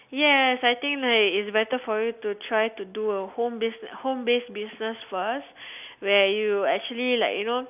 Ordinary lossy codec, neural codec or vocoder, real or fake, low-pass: none; none; real; 3.6 kHz